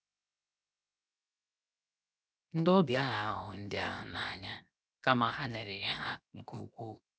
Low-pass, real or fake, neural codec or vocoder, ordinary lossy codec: none; fake; codec, 16 kHz, 0.7 kbps, FocalCodec; none